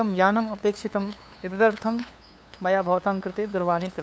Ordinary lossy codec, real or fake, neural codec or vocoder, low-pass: none; fake; codec, 16 kHz, 2 kbps, FunCodec, trained on LibriTTS, 25 frames a second; none